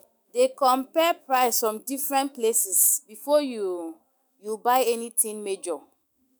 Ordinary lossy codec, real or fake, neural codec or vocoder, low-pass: none; fake; autoencoder, 48 kHz, 128 numbers a frame, DAC-VAE, trained on Japanese speech; none